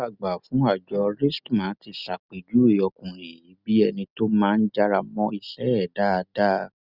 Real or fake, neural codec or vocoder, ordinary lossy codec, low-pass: real; none; Opus, 64 kbps; 5.4 kHz